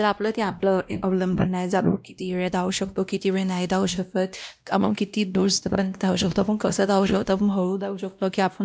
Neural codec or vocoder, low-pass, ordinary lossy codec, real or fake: codec, 16 kHz, 1 kbps, X-Codec, WavLM features, trained on Multilingual LibriSpeech; none; none; fake